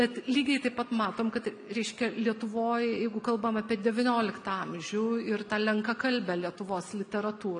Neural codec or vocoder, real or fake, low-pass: none; real; 9.9 kHz